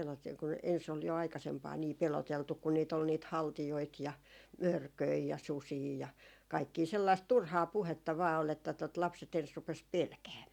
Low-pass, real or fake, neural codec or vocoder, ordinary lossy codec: 19.8 kHz; real; none; none